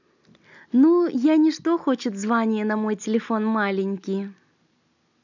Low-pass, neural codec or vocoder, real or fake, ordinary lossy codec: 7.2 kHz; none; real; none